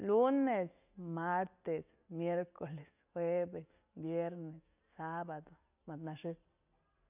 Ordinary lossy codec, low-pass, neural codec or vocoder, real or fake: none; 3.6 kHz; none; real